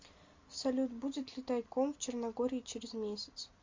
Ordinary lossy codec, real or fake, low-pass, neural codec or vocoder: MP3, 48 kbps; real; 7.2 kHz; none